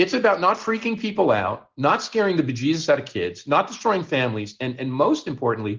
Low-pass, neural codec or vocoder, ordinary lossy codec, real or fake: 7.2 kHz; none; Opus, 16 kbps; real